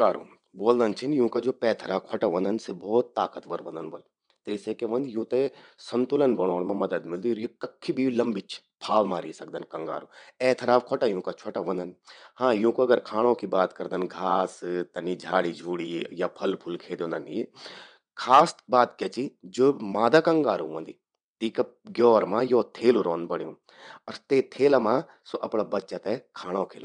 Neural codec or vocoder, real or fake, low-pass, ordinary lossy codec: vocoder, 22.05 kHz, 80 mel bands, WaveNeXt; fake; 9.9 kHz; none